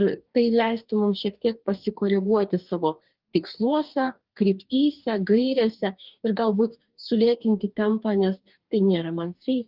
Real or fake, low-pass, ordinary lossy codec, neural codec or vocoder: fake; 5.4 kHz; Opus, 32 kbps; codec, 44.1 kHz, 2.6 kbps, DAC